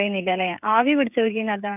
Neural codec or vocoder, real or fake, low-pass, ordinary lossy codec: codec, 16 kHz, 16 kbps, FreqCodec, smaller model; fake; 3.6 kHz; none